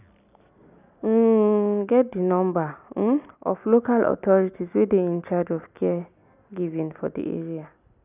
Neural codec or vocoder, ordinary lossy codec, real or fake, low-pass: none; none; real; 3.6 kHz